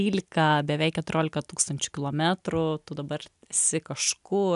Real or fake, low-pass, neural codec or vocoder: real; 10.8 kHz; none